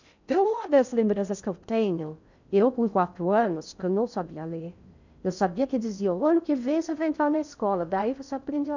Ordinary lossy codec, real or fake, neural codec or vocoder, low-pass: none; fake; codec, 16 kHz in and 24 kHz out, 0.6 kbps, FocalCodec, streaming, 2048 codes; 7.2 kHz